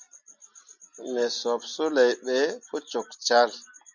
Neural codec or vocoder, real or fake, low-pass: none; real; 7.2 kHz